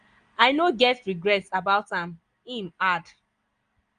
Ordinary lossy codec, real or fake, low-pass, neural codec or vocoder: Opus, 32 kbps; real; 9.9 kHz; none